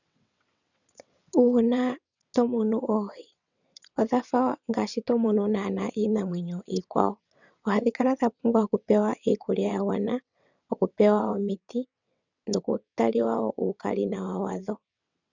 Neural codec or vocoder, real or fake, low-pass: vocoder, 22.05 kHz, 80 mel bands, WaveNeXt; fake; 7.2 kHz